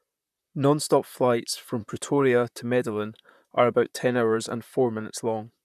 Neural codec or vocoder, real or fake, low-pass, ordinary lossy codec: vocoder, 44.1 kHz, 128 mel bands, Pupu-Vocoder; fake; 14.4 kHz; none